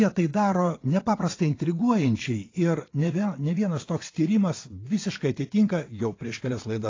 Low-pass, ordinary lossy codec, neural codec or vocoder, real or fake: 7.2 kHz; AAC, 32 kbps; vocoder, 22.05 kHz, 80 mel bands, WaveNeXt; fake